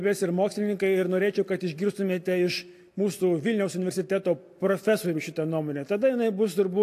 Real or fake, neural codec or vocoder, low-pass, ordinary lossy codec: real; none; 14.4 kHz; AAC, 64 kbps